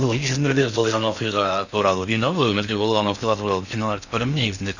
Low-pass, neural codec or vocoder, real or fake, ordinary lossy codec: 7.2 kHz; codec, 16 kHz in and 24 kHz out, 0.6 kbps, FocalCodec, streaming, 4096 codes; fake; none